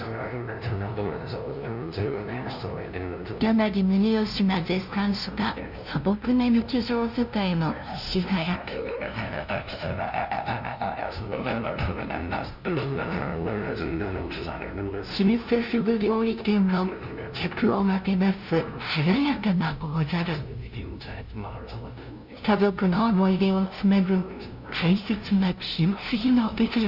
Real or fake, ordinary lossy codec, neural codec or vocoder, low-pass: fake; none; codec, 16 kHz, 0.5 kbps, FunCodec, trained on LibriTTS, 25 frames a second; 5.4 kHz